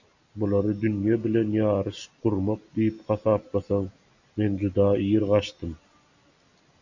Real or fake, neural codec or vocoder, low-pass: real; none; 7.2 kHz